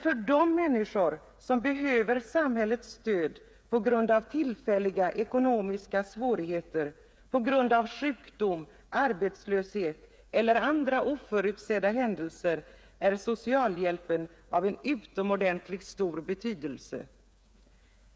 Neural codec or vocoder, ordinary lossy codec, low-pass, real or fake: codec, 16 kHz, 8 kbps, FreqCodec, smaller model; none; none; fake